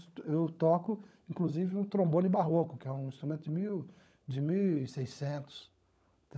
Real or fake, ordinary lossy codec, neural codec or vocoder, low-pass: fake; none; codec, 16 kHz, 16 kbps, FunCodec, trained on LibriTTS, 50 frames a second; none